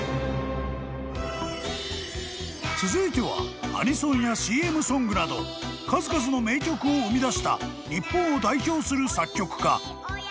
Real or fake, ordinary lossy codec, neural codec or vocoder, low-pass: real; none; none; none